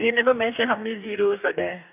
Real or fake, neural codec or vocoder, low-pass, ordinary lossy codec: fake; codec, 44.1 kHz, 2.6 kbps, DAC; 3.6 kHz; none